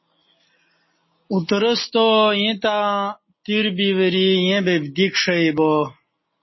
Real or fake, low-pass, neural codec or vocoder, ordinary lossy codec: real; 7.2 kHz; none; MP3, 24 kbps